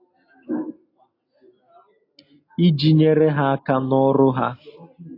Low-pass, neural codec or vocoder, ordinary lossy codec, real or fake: 5.4 kHz; none; AAC, 32 kbps; real